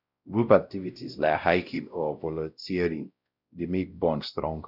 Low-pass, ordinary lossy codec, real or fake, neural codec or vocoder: 5.4 kHz; none; fake; codec, 16 kHz, 0.5 kbps, X-Codec, WavLM features, trained on Multilingual LibriSpeech